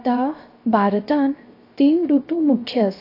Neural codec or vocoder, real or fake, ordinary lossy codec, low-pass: codec, 16 kHz, 0.3 kbps, FocalCodec; fake; AAC, 32 kbps; 5.4 kHz